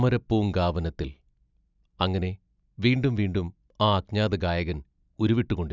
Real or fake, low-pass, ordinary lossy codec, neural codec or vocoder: real; 7.2 kHz; none; none